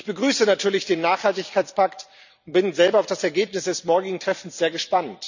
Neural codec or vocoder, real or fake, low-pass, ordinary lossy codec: vocoder, 44.1 kHz, 128 mel bands every 256 samples, BigVGAN v2; fake; 7.2 kHz; none